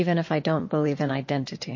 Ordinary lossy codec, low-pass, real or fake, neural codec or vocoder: MP3, 32 kbps; 7.2 kHz; fake; vocoder, 22.05 kHz, 80 mel bands, WaveNeXt